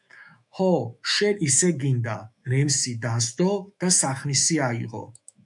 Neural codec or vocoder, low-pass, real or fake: autoencoder, 48 kHz, 128 numbers a frame, DAC-VAE, trained on Japanese speech; 10.8 kHz; fake